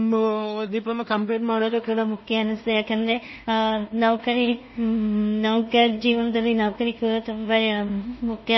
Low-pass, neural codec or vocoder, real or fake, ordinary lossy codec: 7.2 kHz; codec, 16 kHz in and 24 kHz out, 0.4 kbps, LongCat-Audio-Codec, two codebook decoder; fake; MP3, 24 kbps